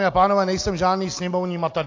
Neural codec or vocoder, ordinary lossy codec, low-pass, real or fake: none; AAC, 48 kbps; 7.2 kHz; real